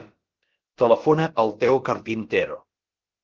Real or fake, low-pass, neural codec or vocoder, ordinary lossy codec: fake; 7.2 kHz; codec, 16 kHz, about 1 kbps, DyCAST, with the encoder's durations; Opus, 16 kbps